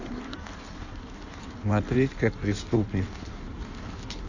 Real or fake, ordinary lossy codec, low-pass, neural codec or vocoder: fake; none; 7.2 kHz; codec, 16 kHz in and 24 kHz out, 1.1 kbps, FireRedTTS-2 codec